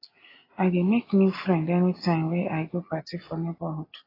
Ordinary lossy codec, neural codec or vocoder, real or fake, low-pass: AAC, 24 kbps; none; real; 5.4 kHz